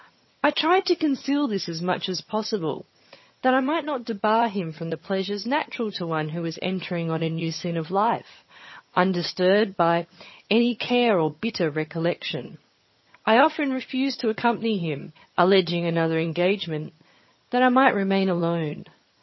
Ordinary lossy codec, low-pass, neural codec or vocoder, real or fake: MP3, 24 kbps; 7.2 kHz; vocoder, 22.05 kHz, 80 mel bands, HiFi-GAN; fake